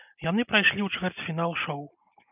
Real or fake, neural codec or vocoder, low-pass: real; none; 3.6 kHz